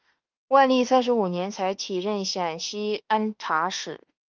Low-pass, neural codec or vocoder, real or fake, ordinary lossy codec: 7.2 kHz; autoencoder, 48 kHz, 32 numbers a frame, DAC-VAE, trained on Japanese speech; fake; Opus, 24 kbps